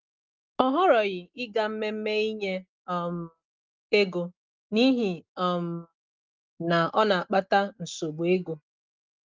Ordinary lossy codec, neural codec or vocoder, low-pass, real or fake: Opus, 32 kbps; none; 7.2 kHz; real